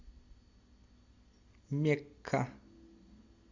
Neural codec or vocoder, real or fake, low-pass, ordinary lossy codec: none; real; 7.2 kHz; MP3, 64 kbps